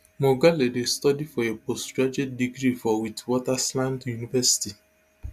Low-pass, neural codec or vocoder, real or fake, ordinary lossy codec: 14.4 kHz; none; real; none